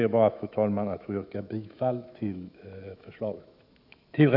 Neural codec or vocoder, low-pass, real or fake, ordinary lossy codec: autoencoder, 48 kHz, 128 numbers a frame, DAC-VAE, trained on Japanese speech; 5.4 kHz; fake; none